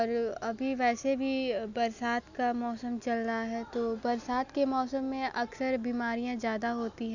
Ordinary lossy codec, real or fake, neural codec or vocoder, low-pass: none; real; none; 7.2 kHz